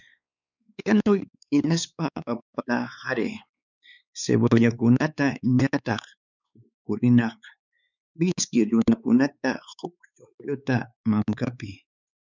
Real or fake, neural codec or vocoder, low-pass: fake; codec, 16 kHz, 4 kbps, X-Codec, WavLM features, trained on Multilingual LibriSpeech; 7.2 kHz